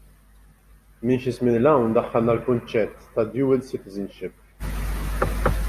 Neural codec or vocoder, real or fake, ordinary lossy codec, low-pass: none; real; Opus, 64 kbps; 14.4 kHz